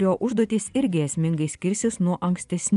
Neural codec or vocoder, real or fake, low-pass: vocoder, 24 kHz, 100 mel bands, Vocos; fake; 10.8 kHz